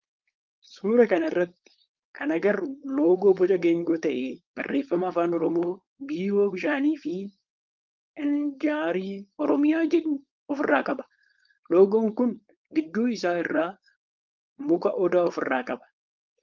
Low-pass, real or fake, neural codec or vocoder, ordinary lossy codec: 7.2 kHz; fake; codec, 16 kHz, 4.8 kbps, FACodec; Opus, 32 kbps